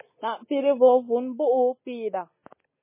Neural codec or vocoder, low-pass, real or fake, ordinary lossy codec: none; 3.6 kHz; real; MP3, 16 kbps